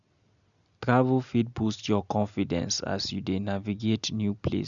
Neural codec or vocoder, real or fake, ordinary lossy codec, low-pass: none; real; none; 7.2 kHz